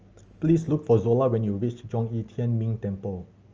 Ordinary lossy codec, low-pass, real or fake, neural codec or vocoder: Opus, 24 kbps; 7.2 kHz; real; none